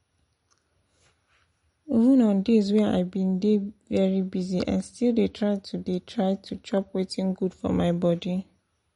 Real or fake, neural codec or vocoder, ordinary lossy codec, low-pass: real; none; MP3, 48 kbps; 10.8 kHz